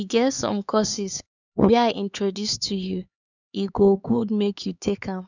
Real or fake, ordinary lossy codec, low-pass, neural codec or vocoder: fake; none; 7.2 kHz; codec, 16 kHz, 4 kbps, X-Codec, HuBERT features, trained on LibriSpeech